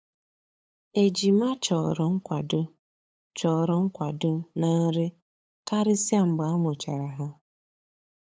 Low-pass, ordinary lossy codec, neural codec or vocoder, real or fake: none; none; codec, 16 kHz, 8 kbps, FunCodec, trained on LibriTTS, 25 frames a second; fake